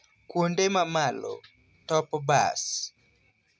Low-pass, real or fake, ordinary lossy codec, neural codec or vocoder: none; real; none; none